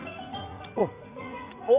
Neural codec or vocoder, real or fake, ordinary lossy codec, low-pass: vocoder, 44.1 kHz, 80 mel bands, Vocos; fake; Opus, 64 kbps; 3.6 kHz